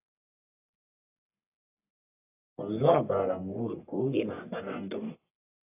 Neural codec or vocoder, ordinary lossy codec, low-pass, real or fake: codec, 44.1 kHz, 1.7 kbps, Pupu-Codec; AAC, 24 kbps; 3.6 kHz; fake